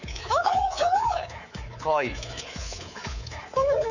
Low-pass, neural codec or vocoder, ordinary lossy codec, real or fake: 7.2 kHz; codec, 24 kHz, 3.1 kbps, DualCodec; none; fake